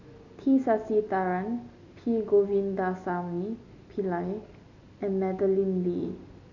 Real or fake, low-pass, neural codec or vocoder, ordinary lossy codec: real; 7.2 kHz; none; none